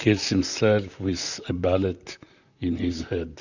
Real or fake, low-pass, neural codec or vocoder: fake; 7.2 kHz; vocoder, 44.1 kHz, 128 mel bands, Pupu-Vocoder